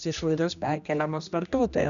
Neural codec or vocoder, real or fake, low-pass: codec, 16 kHz, 1 kbps, X-Codec, HuBERT features, trained on general audio; fake; 7.2 kHz